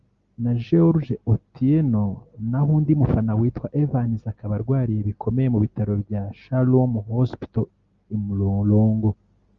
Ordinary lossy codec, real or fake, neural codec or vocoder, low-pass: Opus, 32 kbps; real; none; 7.2 kHz